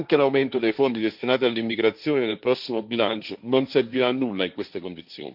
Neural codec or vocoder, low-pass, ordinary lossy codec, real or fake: codec, 16 kHz, 1.1 kbps, Voila-Tokenizer; 5.4 kHz; none; fake